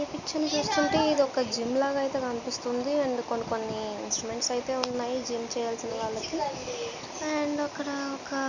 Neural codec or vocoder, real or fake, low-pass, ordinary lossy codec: none; real; 7.2 kHz; none